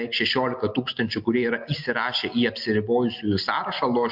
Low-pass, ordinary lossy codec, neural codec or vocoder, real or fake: 5.4 kHz; MP3, 48 kbps; none; real